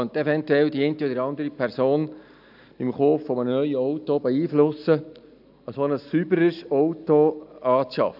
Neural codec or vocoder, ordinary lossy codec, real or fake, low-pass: none; AAC, 48 kbps; real; 5.4 kHz